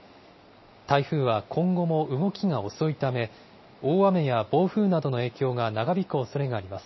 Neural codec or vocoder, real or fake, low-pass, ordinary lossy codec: none; real; 7.2 kHz; MP3, 24 kbps